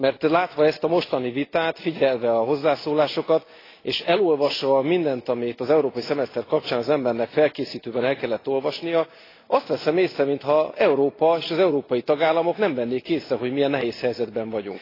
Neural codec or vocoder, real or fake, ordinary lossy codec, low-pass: none; real; AAC, 24 kbps; 5.4 kHz